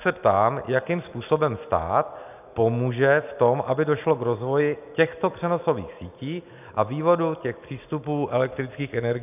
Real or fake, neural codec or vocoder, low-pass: real; none; 3.6 kHz